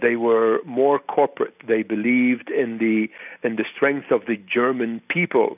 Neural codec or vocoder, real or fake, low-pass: none; real; 3.6 kHz